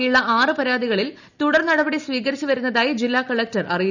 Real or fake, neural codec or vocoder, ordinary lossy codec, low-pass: real; none; none; 7.2 kHz